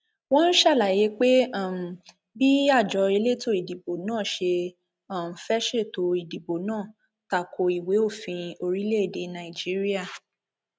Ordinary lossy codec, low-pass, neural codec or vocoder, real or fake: none; none; none; real